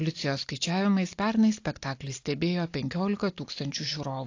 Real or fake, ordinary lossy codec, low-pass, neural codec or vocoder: real; AAC, 48 kbps; 7.2 kHz; none